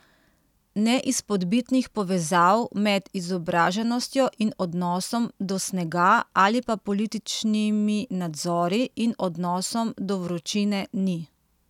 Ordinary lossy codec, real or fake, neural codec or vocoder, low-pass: none; real; none; 19.8 kHz